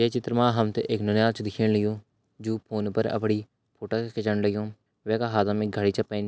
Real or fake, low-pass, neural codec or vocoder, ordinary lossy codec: real; none; none; none